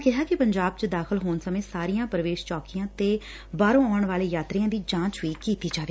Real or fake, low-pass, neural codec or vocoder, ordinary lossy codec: real; none; none; none